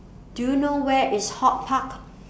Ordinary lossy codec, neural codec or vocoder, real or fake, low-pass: none; none; real; none